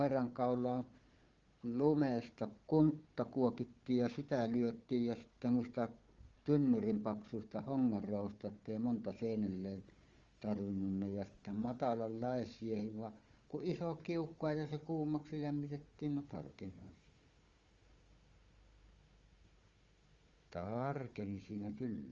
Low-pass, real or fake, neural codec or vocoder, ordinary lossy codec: 7.2 kHz; fake; codec, 16 kHz, 4 kbps, FunCodec, trained on Chinese and English, 50 frames a second; Opus, 32 kbps